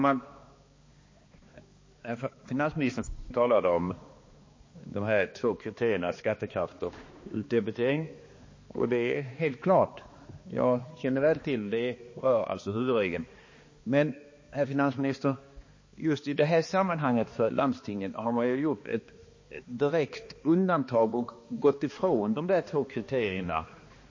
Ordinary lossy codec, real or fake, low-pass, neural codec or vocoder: MP3, 32 kbps; fake; 7.2 kHz; codec, 16 kHz, 2 kbps, X-Codec, HuBERT features, trained on balanced general audio